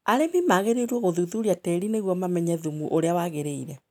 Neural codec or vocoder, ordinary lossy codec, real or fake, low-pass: none; none; real; 19.8 kHz